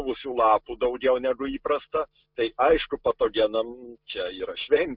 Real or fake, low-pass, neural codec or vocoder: real; 5.4 kHz; none